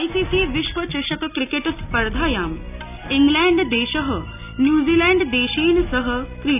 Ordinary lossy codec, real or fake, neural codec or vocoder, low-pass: none; real; none; 3.6 kHz